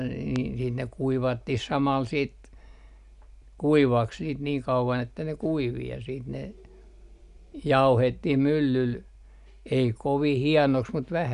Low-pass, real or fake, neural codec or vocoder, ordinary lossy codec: 10.8 kHz; real; none; none